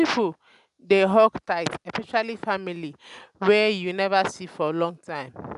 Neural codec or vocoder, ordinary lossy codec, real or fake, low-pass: none; none; real; 10.8 kHz